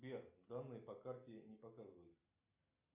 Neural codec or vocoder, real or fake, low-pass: none; real; 3.6 kHz